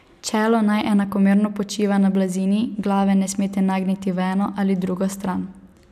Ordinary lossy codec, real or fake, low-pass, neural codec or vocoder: none; real; 14.4 kHz; none